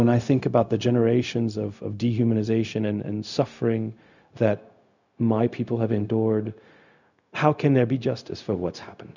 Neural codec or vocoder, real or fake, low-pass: codec, 16 kHz, 0.4 kbps, LongCat-Audio-Codec; fake; 7.2 kHz